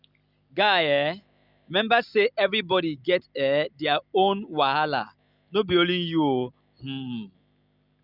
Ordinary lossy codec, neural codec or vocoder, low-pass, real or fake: none; none; 5.4 kHz; real